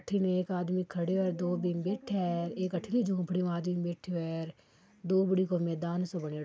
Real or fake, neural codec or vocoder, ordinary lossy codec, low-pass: real; none; none; none